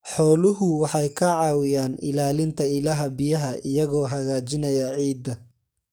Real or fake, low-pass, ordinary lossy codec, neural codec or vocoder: fake; none; none; codec, 44.1 kHz, 7.8 kbps, DAC